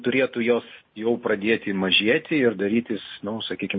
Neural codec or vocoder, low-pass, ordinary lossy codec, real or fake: none; 7.2 kHz; MP3, 24 kbps; real